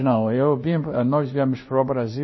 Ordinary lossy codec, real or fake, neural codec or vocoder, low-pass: MP3, 24 kbps; fake; codec, 16 kHz in and 24 kHz out, 1 kbps, XY-Tokenizer; 7.2 kHz